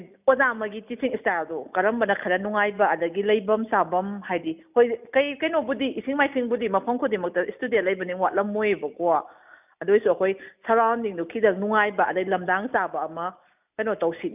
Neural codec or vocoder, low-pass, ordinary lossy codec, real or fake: none; 3.6 kHz; none; real